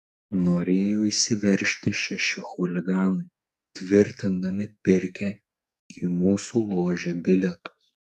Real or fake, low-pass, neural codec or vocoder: fake; 14.4 kHz; codec, 44.1 kHz, 2.6 kbps, SNAC